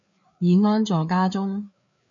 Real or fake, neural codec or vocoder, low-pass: fake; codec, 16 kHz, 4 kbps, FreqCodec, larger model; 7.2 kHz